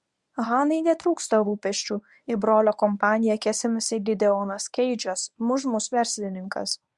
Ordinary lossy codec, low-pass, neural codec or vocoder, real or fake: Opus, 64 kbps; 10.8 kHz; codec, 24 kHz, 0.9 kbps, WavTokenizer, medium speech release version 1; fake